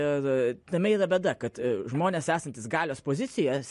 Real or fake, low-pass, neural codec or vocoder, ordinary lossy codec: real; 14.4 kHz; none; MP3, 48 kbps